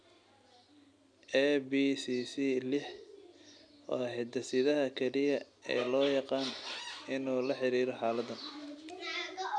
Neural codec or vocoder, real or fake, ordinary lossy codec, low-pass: none; real; none; 9.9 kHz